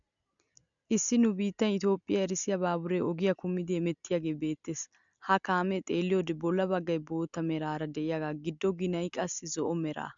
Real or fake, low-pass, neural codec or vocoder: real; 7.2 kHz; none